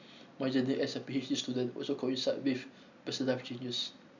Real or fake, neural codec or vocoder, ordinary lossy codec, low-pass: real; none; none; 7.2 kHz